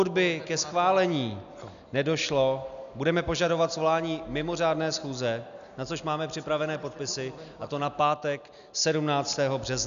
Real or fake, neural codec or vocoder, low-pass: real; none; 7.2 kHz